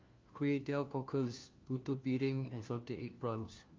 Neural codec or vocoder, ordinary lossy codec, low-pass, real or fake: codec, 16 kHz, 1 kbps, FunCodec, trained on LibriTTS, 50 frames a second; Opus, 24 kbps; 7.2 kHz; fake